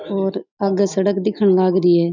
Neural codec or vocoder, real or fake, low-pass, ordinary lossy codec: none; real; 7.2 kHz; none